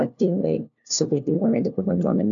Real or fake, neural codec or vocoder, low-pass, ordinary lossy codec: fake; codec, 16 kHz, 1 kbps, FunCodec, trained on LibriTTS, 50 frames a second; 7.2 kHz; MP3, 96 kbps